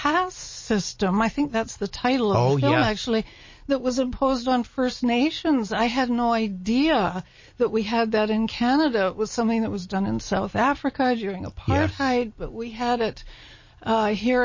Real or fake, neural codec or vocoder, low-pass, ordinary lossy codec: real; none; 7.2 kHz; MP3, 32 kbps